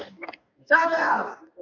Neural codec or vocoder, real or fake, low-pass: codec, 44.1 kHz, 2.6 kbps, DAC; fake; 7.2 kHz